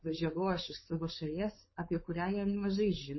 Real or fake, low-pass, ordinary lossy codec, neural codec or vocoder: fake; 7.2 kHz; MP3, 24 kbps; codec, 16 kHz, 4.8 kbps, FACodec